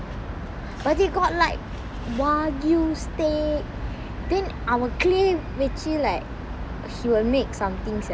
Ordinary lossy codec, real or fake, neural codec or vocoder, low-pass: none; real; none; none